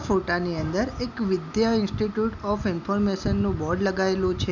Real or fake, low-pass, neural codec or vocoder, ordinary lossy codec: real; 7.2 kHz; none; none